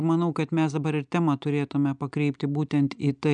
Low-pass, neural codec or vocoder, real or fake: 9.9 kHz; none; real